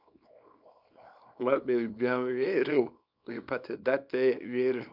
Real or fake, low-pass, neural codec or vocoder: fake; 5.4 kHz; codec, 24 kHz, 0.9 kbps, WavTokenizer, small release